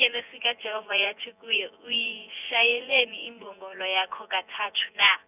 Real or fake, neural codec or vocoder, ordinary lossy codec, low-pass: fake; vocoder, 24 kHz, 100 mel bands, Vocos; none; 3.6 kHz